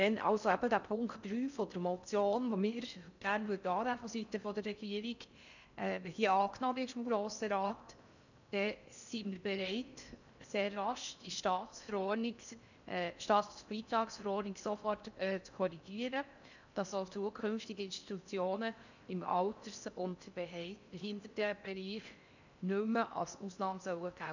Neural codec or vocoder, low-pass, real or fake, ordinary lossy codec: codec, 16 kHz in and 24 kHz out, 0.6 kbps, FocalCodec, streaming, 4096 codes; 7.2 kHz; fake; none